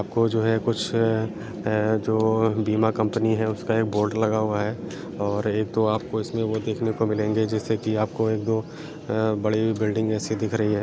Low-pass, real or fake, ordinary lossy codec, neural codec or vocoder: none; real; none; none